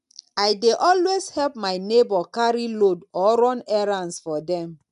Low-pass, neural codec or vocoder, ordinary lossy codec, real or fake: 10.8 kHz; none; none; real